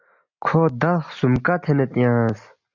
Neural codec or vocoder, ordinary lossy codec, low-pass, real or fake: none; MP3, 48 kbps; 7.2 kHz; real